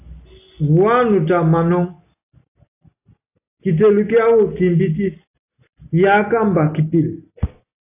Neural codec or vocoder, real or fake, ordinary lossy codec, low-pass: none; real; AAC, 24 kbps; 3.6 kHz